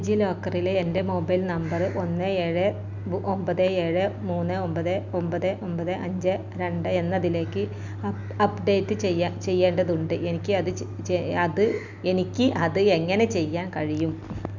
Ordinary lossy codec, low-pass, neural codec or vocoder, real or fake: none; 7.2 kHz; none; real